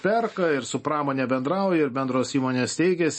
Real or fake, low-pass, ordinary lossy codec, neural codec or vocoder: real; 9.9 kHz; MP3, 32 kbps; none